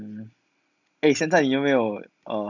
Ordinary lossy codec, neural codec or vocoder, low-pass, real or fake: none; none; 7.2 kHz; real